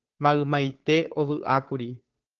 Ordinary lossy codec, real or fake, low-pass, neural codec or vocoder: Opus, 16 kbps; fake; 7.2 kHz; codec, 16 kHz, 2 kbps, FunCodec, trained on Chinese and English, 25 frames a second